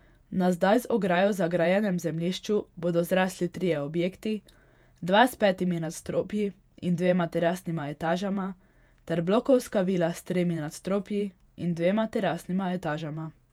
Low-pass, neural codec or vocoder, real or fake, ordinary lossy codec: 19.8 kHz; vocoder, 48 kHz, 128 mel bands, Vocos; fake; none